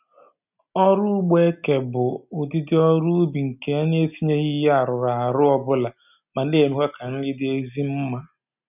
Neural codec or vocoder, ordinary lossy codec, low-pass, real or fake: none; none; 3.6 kHz; real